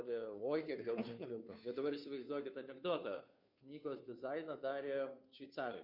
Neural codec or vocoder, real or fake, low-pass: codec, 16 kHz, 2 kbps, FunCodec, trained on Chinese and English, 25 frames a second; fake; 5.4 kHz